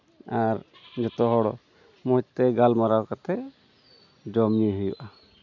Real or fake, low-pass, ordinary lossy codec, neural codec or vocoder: real; 7.2 kHz; none; none